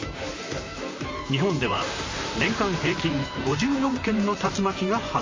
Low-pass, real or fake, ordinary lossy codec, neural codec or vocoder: 7.2 kHz; fake; MP3, 32 kbps; vocoder, 44.1 kHz, 128 mel bands, Pupu-Vocoder